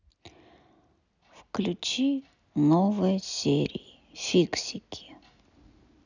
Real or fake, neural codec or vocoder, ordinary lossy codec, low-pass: real; none; none; 7.2 kHz